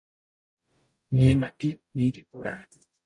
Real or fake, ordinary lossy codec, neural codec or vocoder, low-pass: fake; MP3, 48 kbps; codec, 44.1 kHz, 0.9 kbps, DAC; 10.8 kHz